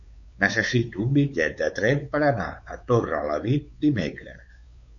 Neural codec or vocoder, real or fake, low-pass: codec, 16 kHz, 4 kbps, X-Codec, WavLM features, trained on Multilingual LibriSpeech; fake; 7.2 kHz